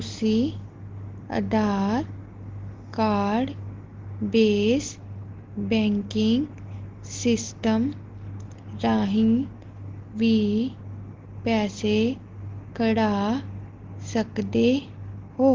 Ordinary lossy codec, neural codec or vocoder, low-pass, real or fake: Opus, 16 kbps; none; 7.2 kHz; real